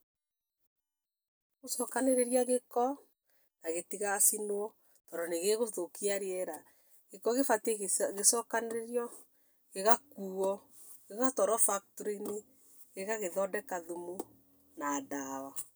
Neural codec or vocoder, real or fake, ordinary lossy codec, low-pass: none; real; none; none